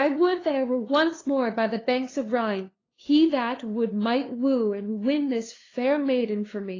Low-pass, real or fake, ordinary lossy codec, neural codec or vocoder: 7.2 kHz; fake; AAC, 32 kbps; codec, 16 kHz, 2 kbps, FunCodec, trained on LibriTTS, 25 frames a second